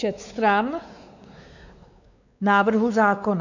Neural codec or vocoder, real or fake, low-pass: codec, 16 kHz, 4 kbps, X-Codec, WavLM features, trained on Multilingual LibriSpeech; fake; 7.2 kHz